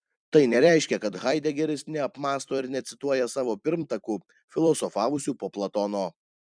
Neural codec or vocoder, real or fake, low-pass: vocoder, 44.1 kHz, 128 mel bands every 256 samples, BigVGAN v2; fake; 9.9 kHz